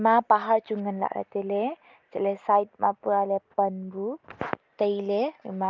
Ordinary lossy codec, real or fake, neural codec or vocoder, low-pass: Opus, 24 kbps; real; none; 7.2 kHz